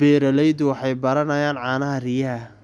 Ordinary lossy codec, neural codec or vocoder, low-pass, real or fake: none; none; none; real